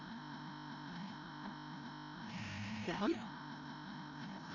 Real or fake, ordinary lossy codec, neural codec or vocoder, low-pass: fake; none; codec, 16 kHz, 0.5 kbps, FreqCodec, larger model; 7.2 kHz